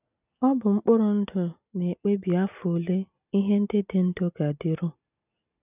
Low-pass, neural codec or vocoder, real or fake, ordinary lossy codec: 3.6 kHz; none; real; none